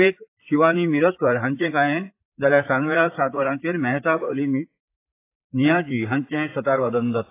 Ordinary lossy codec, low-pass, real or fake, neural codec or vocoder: AAC, 24 kbps; 3.6 kHz; fake; vocoder, 44.1 kHz, 128 mel bands, Pupu-Vocoder